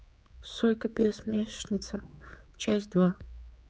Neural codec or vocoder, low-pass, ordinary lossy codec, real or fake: codec, 16 kHz, 4 kbps, X-Codec, HuBERT features, trained on general audio; none; none; fake